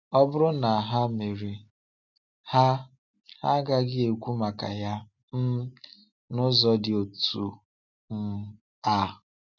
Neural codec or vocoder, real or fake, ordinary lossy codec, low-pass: none; real; none; 7.2 kHz